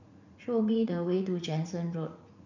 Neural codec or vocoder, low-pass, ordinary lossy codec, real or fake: vocoder, 44.1 kHz, 80 mel bands, Vocos; 7.2 kHz; none; fake